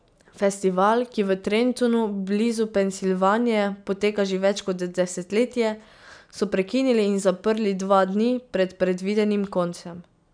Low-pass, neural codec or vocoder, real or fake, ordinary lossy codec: 9.9 kHz; none; real; none